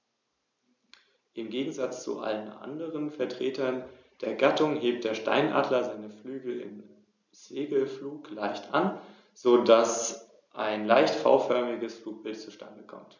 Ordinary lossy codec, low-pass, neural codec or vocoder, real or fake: none; 7.2 kHz; none; real